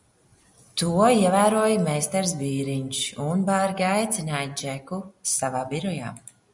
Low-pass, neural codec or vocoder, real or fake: 10.8 kHz; none; real